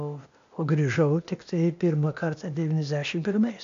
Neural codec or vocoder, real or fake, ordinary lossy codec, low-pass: codec, 16 kHz, 0.8 kbps, ZipCodec; fake; AAC, 64 kbps; 7.2 kHz